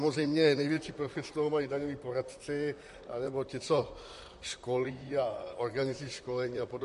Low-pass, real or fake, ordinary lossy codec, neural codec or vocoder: 14.4 kHz; fake; MP3, 48 kbps; vocoder, 44.1 kHz, 128 mel bands, Pupu-Vocoder